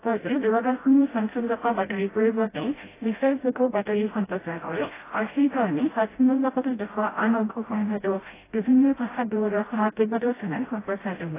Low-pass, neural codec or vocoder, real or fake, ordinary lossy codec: 3.6 kHz; codec, 16 kHz, 0.5 kbps, FreqCodec, smaller model; fake; AAC, 16 kbps